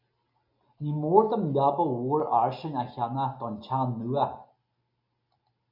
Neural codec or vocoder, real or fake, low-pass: none; real; 5.4 kHz